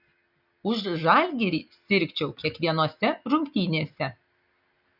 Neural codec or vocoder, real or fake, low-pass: none; real; 5.4 kHz